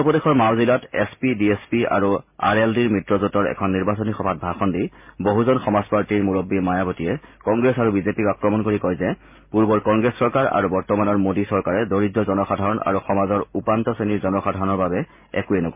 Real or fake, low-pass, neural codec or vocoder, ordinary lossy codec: real; 3.6 kHz; none; MP3, 32 kbps